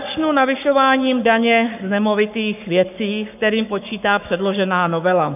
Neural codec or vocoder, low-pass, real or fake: codec, 44.1 kHz, 7.8 kbps, Pupu-Codec; 3.6 kHz; fake